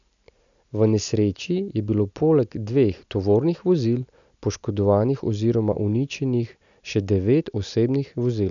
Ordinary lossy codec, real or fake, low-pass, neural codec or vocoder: none; real; 7.2 kHz; none